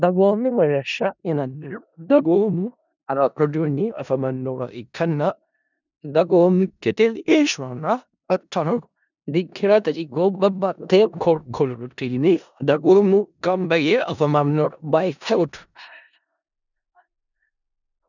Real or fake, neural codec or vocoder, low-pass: fake; codec, 16 kHz in and 24 kHz out, 0.4 kbps, LongCat-Audio-Codec, four codebook decoder; 7.2 kHz